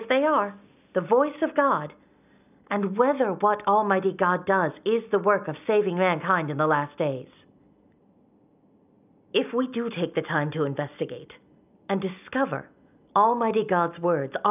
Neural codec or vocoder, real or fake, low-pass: none; real; 3.6 kHz